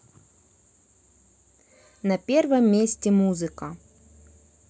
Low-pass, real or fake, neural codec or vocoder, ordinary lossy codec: none; real; none; none